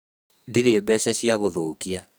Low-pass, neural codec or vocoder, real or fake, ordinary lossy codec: none; codec, 44.1 kHz, 2.6 kbps, SNAC; fake; none